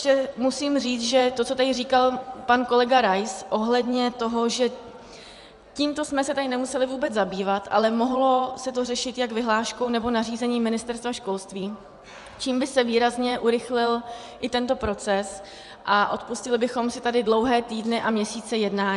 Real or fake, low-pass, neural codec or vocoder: fake; 10.8 kHz; vocoder, 24 kHz, 100 mel bands, Vocos